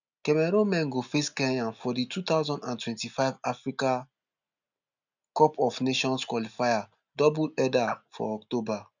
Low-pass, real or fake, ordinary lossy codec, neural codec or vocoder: 7.2 kHz; real; none; none